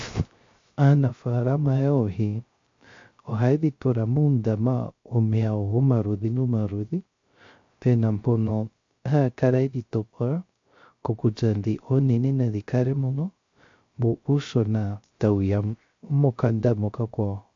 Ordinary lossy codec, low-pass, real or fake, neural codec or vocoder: MP3, 48 kbps; 7.2 kHz; fake; codec, 16 kHz, 0.3 kbps, FocalCodec